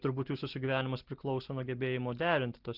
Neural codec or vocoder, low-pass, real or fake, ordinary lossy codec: none; 5.4 kHz; real; Opus, 16 kbps